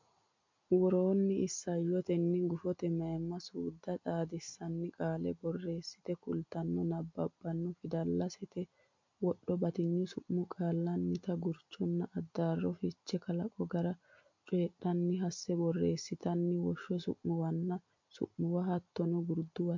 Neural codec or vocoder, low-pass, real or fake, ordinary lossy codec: none; 7.2 kHz; real; MP3, 48 kbps